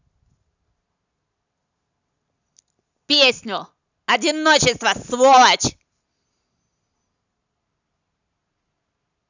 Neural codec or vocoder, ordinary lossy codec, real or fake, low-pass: none; none; real; 7.2 kHz